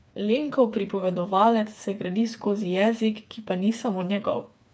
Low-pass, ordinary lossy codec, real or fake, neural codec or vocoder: none; none; fake; codec, 16 kHz, 4 kbps, FreqCodec, smaller model